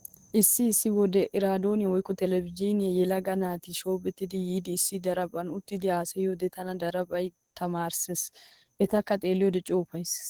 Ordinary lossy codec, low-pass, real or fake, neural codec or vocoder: Opus, 16 kbps; 19.8 kHz; fake; codec, 44.1 kHz, 7.8 kbps, DAC